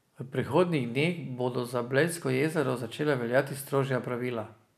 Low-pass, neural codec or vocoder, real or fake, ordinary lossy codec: 14.4 kHz; none; real; none